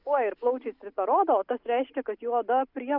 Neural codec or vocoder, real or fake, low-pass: none; real; 5.4 kHz